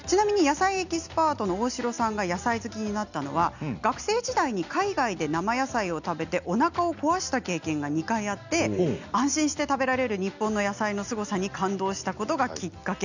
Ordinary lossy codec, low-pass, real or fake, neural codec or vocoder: none; 7.2 kHz; real; none